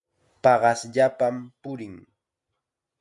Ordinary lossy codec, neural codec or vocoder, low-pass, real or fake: MP3, 96 kbps; none; 10.8 kHz; real